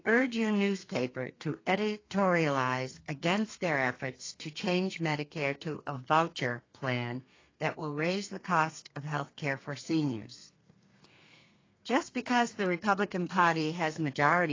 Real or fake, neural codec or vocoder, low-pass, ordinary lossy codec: fake; codec, 32 kHz, 1.9 kbps, SNAC; 7.2 kHz; AAC, 32 kbps